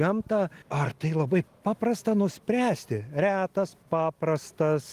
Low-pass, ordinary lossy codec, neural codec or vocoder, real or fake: 14.4 kHz; Opus, 24 kbps; none; real